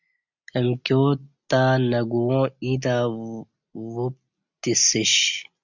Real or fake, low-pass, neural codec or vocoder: real; 7.2 kHz; none